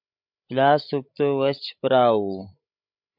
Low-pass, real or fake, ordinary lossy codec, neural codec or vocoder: 5.4 kHz; fake; MP3, 48 kbps; codec, 16 kHz, 16 kbps, FreqCodec, larger model